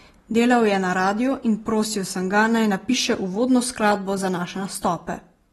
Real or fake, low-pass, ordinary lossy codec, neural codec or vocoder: real; 19.8 kHz; AAC, 32 kbps; none